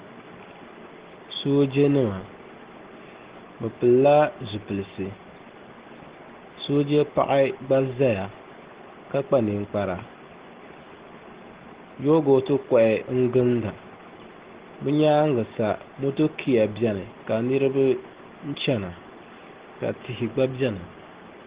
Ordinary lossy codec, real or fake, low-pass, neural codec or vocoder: Opus, 16 kbps; real; 3.6 kHz; none